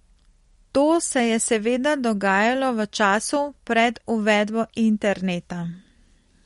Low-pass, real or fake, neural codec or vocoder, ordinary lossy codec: 19.8 kHz; real; none; MP3, 48 kbps